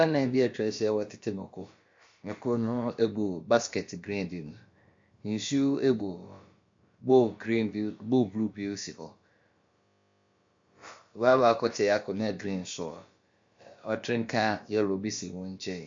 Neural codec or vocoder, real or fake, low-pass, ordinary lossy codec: codec, 16 kHz, about 1 kbps, DyCAST, with the encoder's durations; fake; 7.2 kHz; MP3, 48 kbps